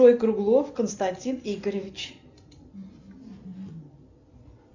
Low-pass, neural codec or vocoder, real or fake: 7.2 kHz; none; real